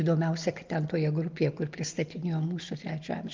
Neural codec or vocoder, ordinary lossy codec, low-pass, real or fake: none; Opus, 32 kbps; 7.2 kHz; real